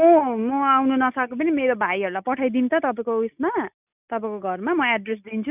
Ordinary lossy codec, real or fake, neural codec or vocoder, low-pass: none; real; none; 3.6 kHz